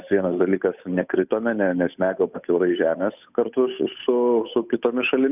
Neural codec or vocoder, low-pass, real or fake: codec, 16 kHz, 8 kbps, FunCodec, trained on Chinese and English, 25 frames a second; 3.6 kHz; fake